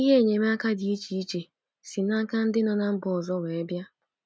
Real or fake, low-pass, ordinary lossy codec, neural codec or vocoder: real; none; none; none